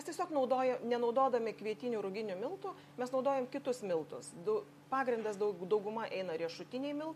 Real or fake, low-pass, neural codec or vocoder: real; 14.4 kHz; none